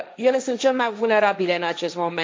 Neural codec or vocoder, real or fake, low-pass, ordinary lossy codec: codec, 16 kHz, 1.1 kbps, Voila-Tokenizer; fake; none; none